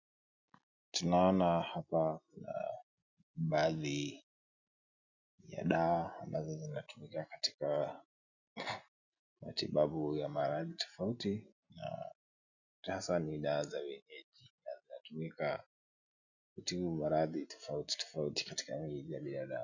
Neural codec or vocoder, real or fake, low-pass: none; real; 7.2 kHz